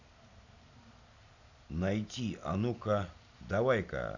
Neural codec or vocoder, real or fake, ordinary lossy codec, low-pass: none; real; none; 7.2 kHz